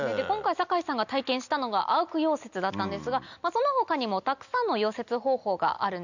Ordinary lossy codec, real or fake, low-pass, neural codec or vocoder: none; real; 7.2 kHz; none